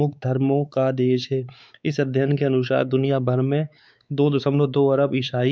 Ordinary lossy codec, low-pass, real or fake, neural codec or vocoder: none; none; fake; codec, 16 kHz, 4 kbps, X-Codec, WavLM features, trained on Multilingual LibriSpeech